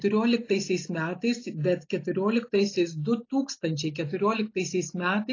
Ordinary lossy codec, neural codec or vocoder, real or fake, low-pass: AAC, 32 kbps; none; real; 7.2 kHz